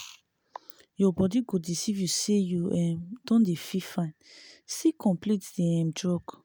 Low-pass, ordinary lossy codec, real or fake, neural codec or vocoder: none; none; real; none